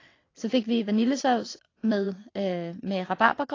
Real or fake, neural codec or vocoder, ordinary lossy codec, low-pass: fake; vocoder, 22.05 kHz, 80 mel bands, WaveNeXt; AAC, 32 kbps; 7.2 kHz